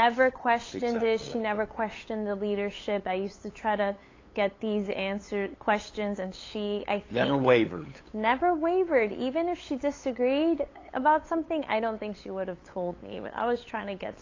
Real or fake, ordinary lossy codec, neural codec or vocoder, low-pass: fake; AAC, 32 kbps; codec, 16 kHz, 8 kbps, FunCodec, trained on LibriTTS, 25 frames a second; 7.2 kHz